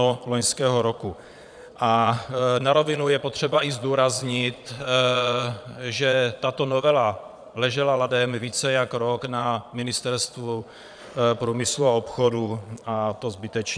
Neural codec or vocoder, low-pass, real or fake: vocoder, 22.05 kHz, 80 mel bands, Vocos; 9.9 kHz; fake